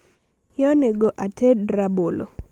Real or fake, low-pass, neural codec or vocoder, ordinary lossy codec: real; 19.8 kHz; none; Opus, 24 kbps